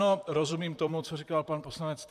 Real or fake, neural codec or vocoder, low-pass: fake; vocoder, 44.1 kHz, 128 mel bands, Pupu-Vocoder; 14.4 kHz